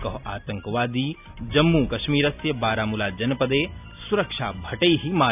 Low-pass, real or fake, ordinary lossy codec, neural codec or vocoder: 3.6 kHz; real; none; none